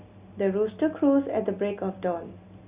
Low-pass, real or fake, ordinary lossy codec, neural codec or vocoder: 3.6 kHz; real; Opus, 64 kbps; none